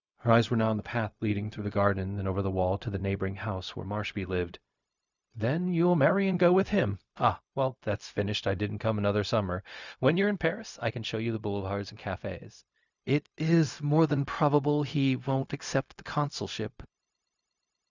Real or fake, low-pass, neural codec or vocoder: fake; 7.2 kHz; codec, 16 kHz, 0.4 kbps, LongCat-Audio-Codec